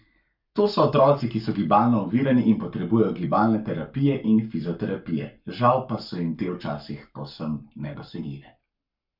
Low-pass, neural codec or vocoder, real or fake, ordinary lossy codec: 5.4 kHz; codec, 44.1 kHz, 7.8 kbps, Pupu-Codec; fake; none